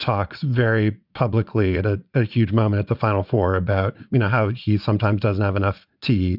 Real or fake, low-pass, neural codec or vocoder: fake; 5.4 kHz; vocoder, 44.1 kHz, 80 mel bands, Vocos